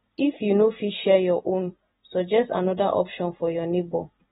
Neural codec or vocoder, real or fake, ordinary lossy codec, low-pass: vocoder, 44.1 kHz, 128 mel bands every 256 samples, BigVGAN v2; fake; AAC, 16 kbps; 19.8 kHz